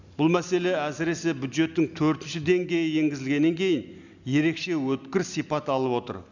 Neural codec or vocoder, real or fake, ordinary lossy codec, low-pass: none; real; none; 7.2 kHz